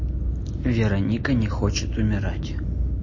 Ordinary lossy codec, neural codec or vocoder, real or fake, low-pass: MP3, 32 kbps; none; real; 7.2 kHz